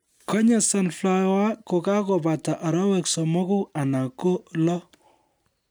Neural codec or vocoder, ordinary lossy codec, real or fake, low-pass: none; none; real; none